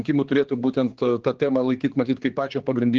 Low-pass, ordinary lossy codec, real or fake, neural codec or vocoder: 7.2 kHz; Opus, 16 kbps; fake; codec, 16 kHz, 4 kbps, X-Codec, HuBERT features, trained on general audio